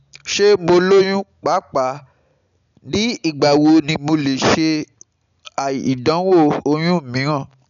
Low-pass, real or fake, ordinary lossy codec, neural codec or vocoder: 7.2 kHz; real; none; none